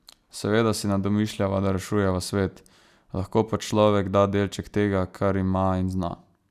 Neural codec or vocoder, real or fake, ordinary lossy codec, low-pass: none; real; none; 14.4 kHz